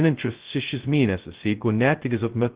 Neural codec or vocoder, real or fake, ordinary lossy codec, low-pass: codec, 16 kHz, 0.2 kbps, FocalCodec; fake; Opus, 16 kbps; 3.6 kHz